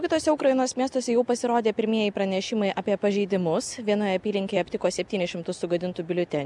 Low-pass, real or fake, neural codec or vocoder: 10.8 kHz; real; none